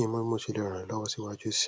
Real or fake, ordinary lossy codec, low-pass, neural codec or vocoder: real; none; none; none